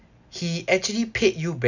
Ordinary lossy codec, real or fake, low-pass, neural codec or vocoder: none; real; 7.2 kHz; none